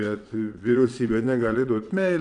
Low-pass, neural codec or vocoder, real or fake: 9.9 kHz; vocoder, 22.05 kHz, 80 mel bands, WaveNeXt; fake